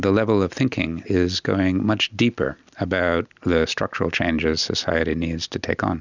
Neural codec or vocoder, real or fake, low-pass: none; real; 7.2 kHz